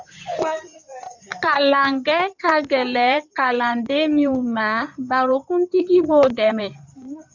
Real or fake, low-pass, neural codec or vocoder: fake; 7.2 kHz; codec, 16 kHz in and 24 kHz out, 2.2 kbps, FireRedTTS-2 codec